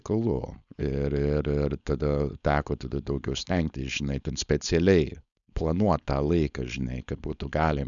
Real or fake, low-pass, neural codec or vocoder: fake; 7.2 kHz; codec, 16 kHz, 4.8 kbps, FACodec